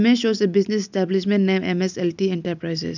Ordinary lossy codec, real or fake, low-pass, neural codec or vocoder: none; real; 7.2 kHz; none